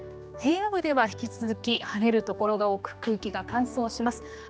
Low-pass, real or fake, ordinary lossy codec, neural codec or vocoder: none; fake; none; codec, 16 kHz, 2 kbps, X-Codec, HuBERT features, trained on general audio